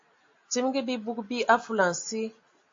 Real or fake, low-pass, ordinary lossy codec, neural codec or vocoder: real; 7.2 kHz; AAC, 48 kbps; none